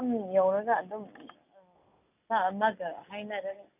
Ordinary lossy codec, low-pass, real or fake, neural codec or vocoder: none; 3.6 kHz; real; none